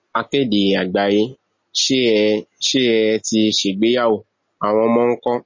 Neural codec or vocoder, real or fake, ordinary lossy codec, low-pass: none; real; MP3, 32 kbps; 7.2 kHz